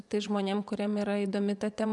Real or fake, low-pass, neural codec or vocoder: fake; 10.8 kHz; vocoder, 24 kHz, 100 mel bands, Vocos